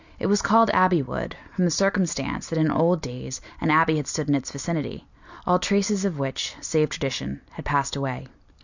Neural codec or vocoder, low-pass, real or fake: none; 7.2 kHz; real